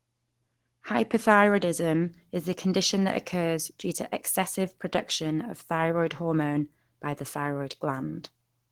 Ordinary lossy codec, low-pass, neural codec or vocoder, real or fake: Opus, 16 kbps; 19.8 kHz; codec, 44.1 kHz, 7.8 kbps, Pupu-Codec; fake